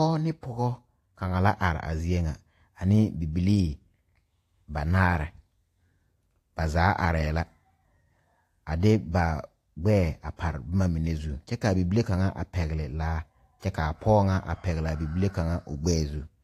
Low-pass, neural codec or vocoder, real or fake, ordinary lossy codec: 14.4 kHz; vocoder, 48 kHz, 128 mel bands, Vocos; fake; AAC, 64 kbps